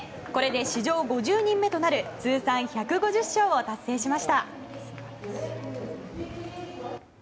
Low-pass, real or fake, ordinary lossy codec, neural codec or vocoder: none; real; none; none